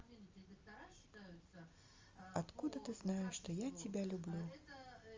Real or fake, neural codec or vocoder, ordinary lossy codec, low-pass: real; none; Opus, 32 kbps; 7.2 kHz